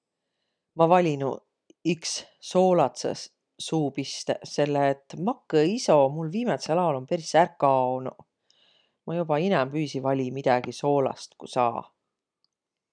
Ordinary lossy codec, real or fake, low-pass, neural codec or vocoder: none; real; 9.9 kHz; none